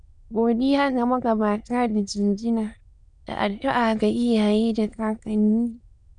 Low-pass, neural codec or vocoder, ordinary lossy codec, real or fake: 9.9 kHz; autoencoder, 22.05 kHz, a latent of 192 numbers a frame, VITS, trained on many speakers; none; fake